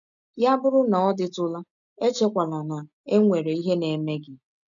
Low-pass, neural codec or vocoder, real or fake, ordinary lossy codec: 7.2 kHz; none; real; none